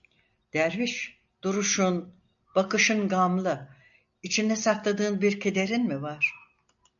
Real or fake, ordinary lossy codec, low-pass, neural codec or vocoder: real; AAC, 64 kbps; 7.2 kHz; none